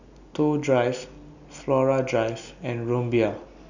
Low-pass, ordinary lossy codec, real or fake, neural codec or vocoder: 7.2 kHz; none; real; none